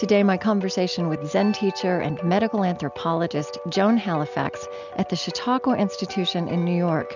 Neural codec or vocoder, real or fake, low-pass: none; real; 7.2 kHz